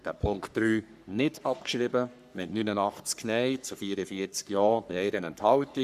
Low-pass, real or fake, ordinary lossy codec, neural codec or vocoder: 14.4 kHz; fake; none; codec, 44.1 kHz, 3.4 kbps, Pupu-Codec